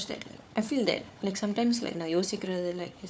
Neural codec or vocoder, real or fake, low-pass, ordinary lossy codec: codec, 16 kHz, 4 kbps, FunCodec, trained on Chinese and English, 50 frames a second; fake; none; none